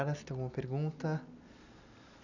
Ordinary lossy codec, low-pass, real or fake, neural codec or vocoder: none; 7.2 kHz; real; none